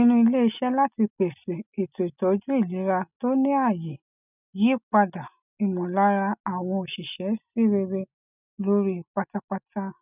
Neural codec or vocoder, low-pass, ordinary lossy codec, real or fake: none; 3.6 kHz; none; real